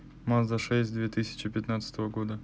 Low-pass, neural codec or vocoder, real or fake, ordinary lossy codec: none; none; real; none